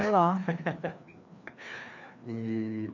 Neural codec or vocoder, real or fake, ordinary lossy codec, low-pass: codec, 16 kHz, 2 kbps, FreqCodec, larger model; fake; none; 7.2 kHz